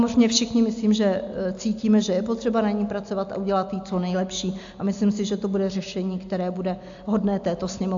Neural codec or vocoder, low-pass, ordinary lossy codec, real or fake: none; 7.2 kHz; AAC, 64 kbps; real